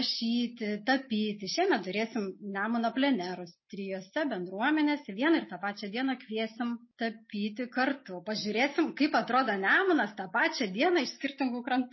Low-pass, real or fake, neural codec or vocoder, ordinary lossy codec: 7.2 kHz; real; none; MP3, 24 kbps